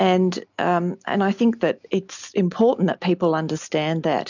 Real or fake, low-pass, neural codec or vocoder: real; 7.2 kHz; none